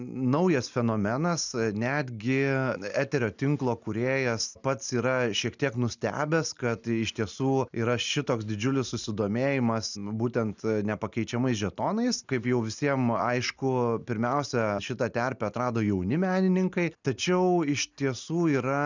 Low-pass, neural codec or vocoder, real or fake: 7.2 kHz; none; real